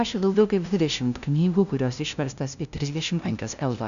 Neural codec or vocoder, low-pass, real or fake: codec, 16 kHz, 0.5 kbps, FunCodec, trained on LibriTTS, 25 frames a second; 7.2 kHz; fake